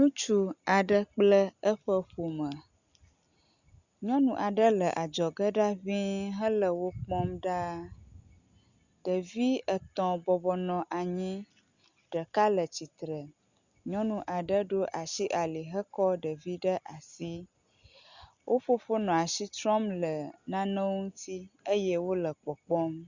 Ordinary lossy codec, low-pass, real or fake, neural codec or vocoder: Opus, 64 kbps; 7.2 kHz; real; none